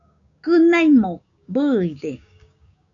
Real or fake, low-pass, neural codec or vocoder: fake; 7.2 kHz; codec, 16 kHz, 6 kbps, DAC